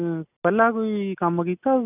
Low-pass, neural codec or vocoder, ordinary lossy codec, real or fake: 3.6 kHz; none; none; real